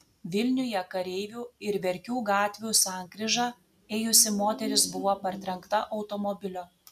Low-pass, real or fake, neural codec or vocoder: 14.4 kHz; real; none